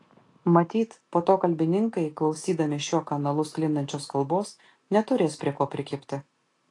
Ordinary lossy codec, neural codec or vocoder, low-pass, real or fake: AAC, 48 kbps; none; 10.8 kHz; real